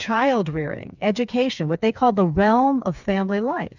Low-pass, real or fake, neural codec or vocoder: 7.2 kHz; fake; codec, 16 kHz, 4 kbps, FreqCodec, smaller model